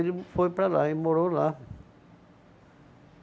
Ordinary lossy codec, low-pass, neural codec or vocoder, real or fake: none; none; none; real